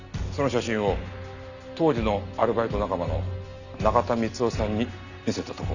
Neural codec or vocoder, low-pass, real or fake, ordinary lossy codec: none; 7.2 kHz; real; none